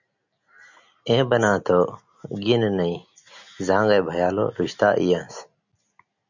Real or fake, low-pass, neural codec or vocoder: real; 7.2 kHz; none